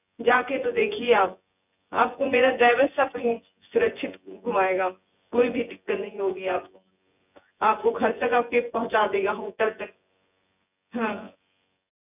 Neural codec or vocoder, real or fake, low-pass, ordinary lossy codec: vocoder, 24 kHz, 100 mel bands, Vocos; fake; 3.6 kHz; none